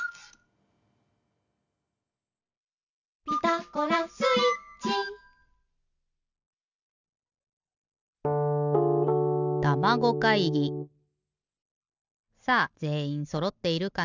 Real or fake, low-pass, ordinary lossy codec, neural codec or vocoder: real; 7.2 kHz; none; none